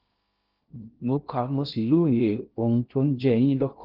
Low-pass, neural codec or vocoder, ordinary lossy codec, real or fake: 5.4 kHz; codec, 16 kHz in and 24 kHz out, 0.6 kbps, FocalCodec, streaming, 2048 codes; Opus, 24 kbps; fake